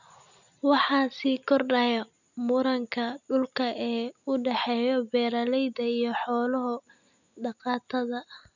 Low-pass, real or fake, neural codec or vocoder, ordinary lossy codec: 7.2 kHz; real; none; none